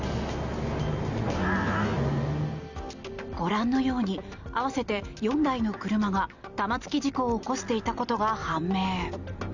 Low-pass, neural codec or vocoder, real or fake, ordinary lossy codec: 7.2 kHz; none; real; none